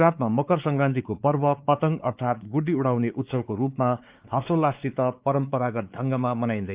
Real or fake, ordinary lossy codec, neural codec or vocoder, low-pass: fake; Opus, 32 kbps; codec, 16 kHz, 4 kbps, X-Codec, WavLM features, trained on Multilingual LibriSpeech; 3.6 kHz